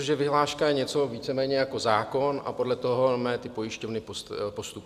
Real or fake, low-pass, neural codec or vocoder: real; 14.4 kHz; none